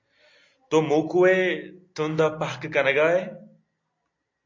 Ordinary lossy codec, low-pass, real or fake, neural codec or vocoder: MP3, 64 kbps; 7.2 kHz; real; none